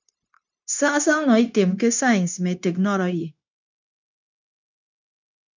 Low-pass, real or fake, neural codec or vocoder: 7.2 kHz; fake; codec, 16 kHz, 0.9 kbps, LongCat-Audio-Codec